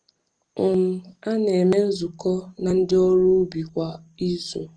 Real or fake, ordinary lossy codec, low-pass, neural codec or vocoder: real; Opus, 24 kbps; 9.9 kHz; none